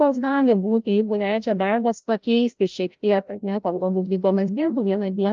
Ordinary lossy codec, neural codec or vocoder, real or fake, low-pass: Opus, 24 kbps; codec, 16 kHz, 0.5 kbps, FreqCodec, larger model; fake; 7.2 kHz